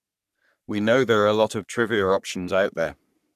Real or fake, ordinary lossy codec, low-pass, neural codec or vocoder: fake; none; 14.4 kHz; codec, 44.1 kHz, 3.4 kbps, Pupu-Codec